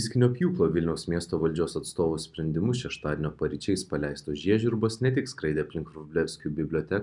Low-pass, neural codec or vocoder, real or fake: 10.8 kHz; none; real